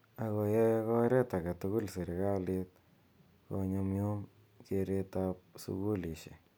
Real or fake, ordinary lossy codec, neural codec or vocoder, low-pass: real; none; none; none